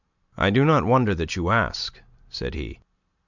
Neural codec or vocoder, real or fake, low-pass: none; real; 7.2 kHz